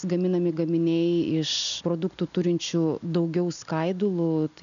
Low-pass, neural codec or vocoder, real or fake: 7.2 kHz; none; real